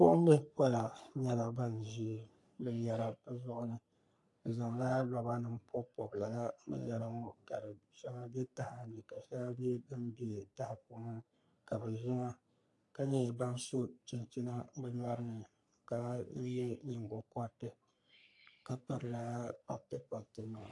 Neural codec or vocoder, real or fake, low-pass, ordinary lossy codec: codec, 44.1 kHz, 3.4 kbps, Pupu-Codec; fake; 10.8 kHz; AAC, 64 kbps